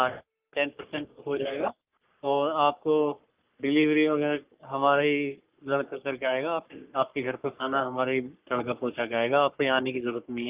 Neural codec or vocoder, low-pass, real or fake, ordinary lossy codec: codec, 44.1 kHz, 3.4 kbps, Pupu-Codec; 3.6 kHz; fake; Opus, 24 kbps